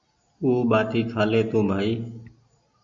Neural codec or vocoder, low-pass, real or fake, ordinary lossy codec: none; 7.2 kHz; real; MP3, 64 kbps